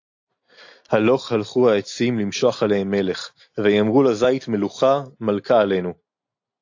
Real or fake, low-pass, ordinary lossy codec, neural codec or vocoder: real; 7.2 kHz; AAC, 48 kbps; none